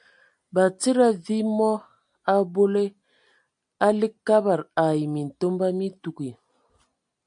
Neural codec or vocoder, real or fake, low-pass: none; real; 9.9 kHz